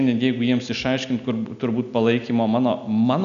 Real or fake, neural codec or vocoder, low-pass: real; none; 7.2 kHz